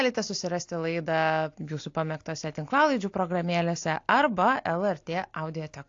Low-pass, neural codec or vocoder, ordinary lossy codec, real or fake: 7.2 kHz; none; AAC, 48 kbps; real